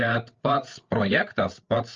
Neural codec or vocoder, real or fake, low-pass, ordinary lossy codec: codec, 16 kHz, 8 kbps, FreqCodec, larger model; fake; 7.2 kHz; Opus, 24 kbps